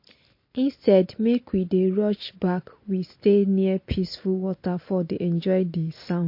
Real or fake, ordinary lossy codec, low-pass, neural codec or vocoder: real; MP3, 32 kbps; 5.4 kHz; none